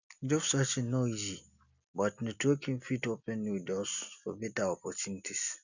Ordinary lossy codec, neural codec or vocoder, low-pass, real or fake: none; none; 7.2 kHz; real